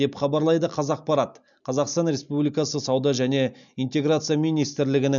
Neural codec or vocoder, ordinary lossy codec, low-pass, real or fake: none; none; 7.2 kHz; real